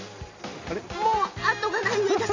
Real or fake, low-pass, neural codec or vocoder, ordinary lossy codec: real; 7.2 kHz; none; MP3, 64 kbps